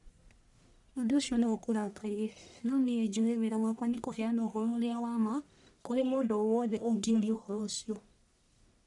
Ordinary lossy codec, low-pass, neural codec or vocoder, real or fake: none; 10.8 kHz; codec, 44.1 kHz, 1.7 kbps, Pupu-Codec; fake